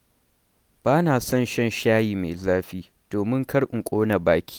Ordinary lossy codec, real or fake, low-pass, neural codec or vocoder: none; real; none; none